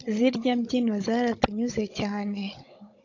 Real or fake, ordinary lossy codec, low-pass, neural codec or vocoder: fake; AAC, 48 kbps; 7.2 kHz; codec, 16 kHz, 16 kbps, FunCodec, trained on Chinese and English, 50 frames a second